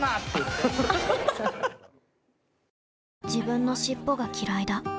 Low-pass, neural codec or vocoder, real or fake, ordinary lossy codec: none; none; real; none